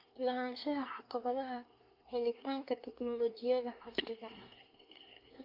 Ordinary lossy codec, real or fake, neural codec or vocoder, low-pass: none; fake; codec, 24 kHz, 1 kbps, SNAC; 5.4 kHz